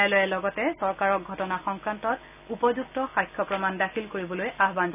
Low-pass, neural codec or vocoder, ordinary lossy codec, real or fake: 3.6 kHz; none; none; real